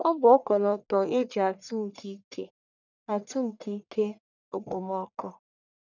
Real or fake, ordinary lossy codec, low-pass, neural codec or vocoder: fake; none; 7.2 kHz; codec, 44.1 kHz, 1.7 kbps, Pupu-Codec